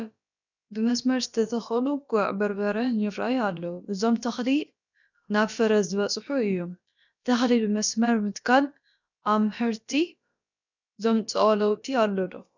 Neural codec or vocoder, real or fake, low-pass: codec, 16 kHz, about 1 kbps, DyCAST, with the encoder's durations; fake; 7.2 kHz